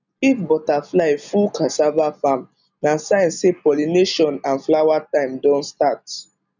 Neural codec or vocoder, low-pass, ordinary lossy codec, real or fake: none; 7.2 kHz; none; real